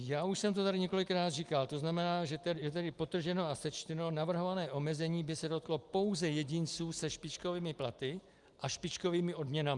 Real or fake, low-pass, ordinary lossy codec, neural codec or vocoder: real; 10.8 kHz; Opus, 32 kbps; none